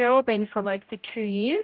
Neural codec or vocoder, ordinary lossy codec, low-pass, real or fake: codec, 16 kHz, 0.5 kbps, X-Codec, HuBERT features, trained on general audio; Opus, 24 kbps; 5.4 kHz; fake